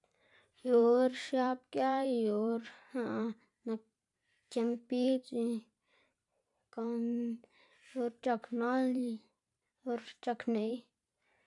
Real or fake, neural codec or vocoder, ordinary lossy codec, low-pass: fake; vocoder, 44.1 kHz, 128 mel bands every 512 samples, BigVGAN v2; none; 10.8 kHz